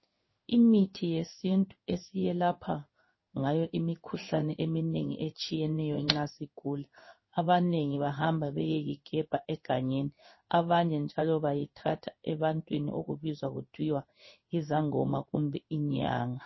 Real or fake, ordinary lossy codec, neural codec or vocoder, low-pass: fake; MP3, 24 kbps; codec, 16 kHz in and 24 kHz out, 1 kbps, XY-Tokenizer; 7.2 kHz